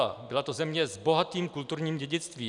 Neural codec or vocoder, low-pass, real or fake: none; 10.8 kHz; real